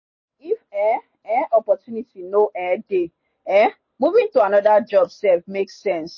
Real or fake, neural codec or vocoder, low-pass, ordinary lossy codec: real; none; 7.2 kHz; MP3, 32 kbps